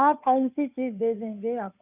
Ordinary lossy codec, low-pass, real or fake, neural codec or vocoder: none; 3.6 kHz; fake; codec, 16 kHz, 2 kbps, FunCodec, trained on Chinese and English, 25 frames a second